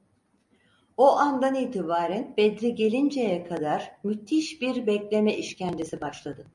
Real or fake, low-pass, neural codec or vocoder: real; 10.8 kHz; none